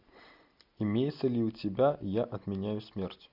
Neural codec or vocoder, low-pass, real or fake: none; 5.4 kHz; real